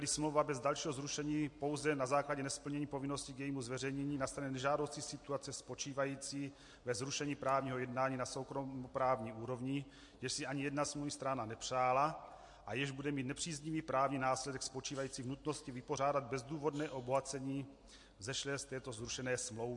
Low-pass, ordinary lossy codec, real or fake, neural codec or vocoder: 10.8 kHz; MP3, 48 kbps; real; none